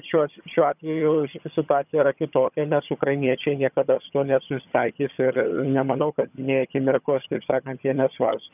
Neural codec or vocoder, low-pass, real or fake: vocoder, 22.05 kHz, 80 mel bands, HiFi-GAN; 3.6 kHz; fake